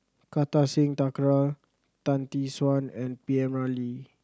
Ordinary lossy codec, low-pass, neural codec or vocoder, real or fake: none; none; none; real